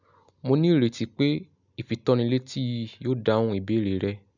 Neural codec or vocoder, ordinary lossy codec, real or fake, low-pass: none; none; real; 7.2 kHz